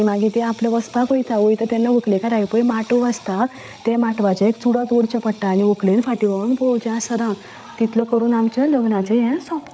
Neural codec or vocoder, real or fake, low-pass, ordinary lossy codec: codec, 16 kHz, 8 kbps, FreqCodec, larger model; fake; none; none